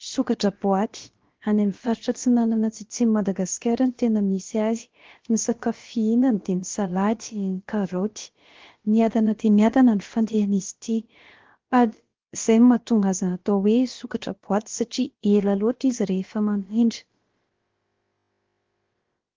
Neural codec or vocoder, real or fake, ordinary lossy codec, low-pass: codec, 16 kHz, about 1 kbps, DyCAST, with the encoder's durations; fake; Opus, 16 kbps; 7.2 kHz